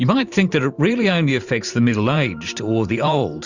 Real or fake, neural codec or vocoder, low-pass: fake; vocoder, 44.1 kHz, 128 mel bands every 512 samples, BigVGAN v2; 7.2 kHz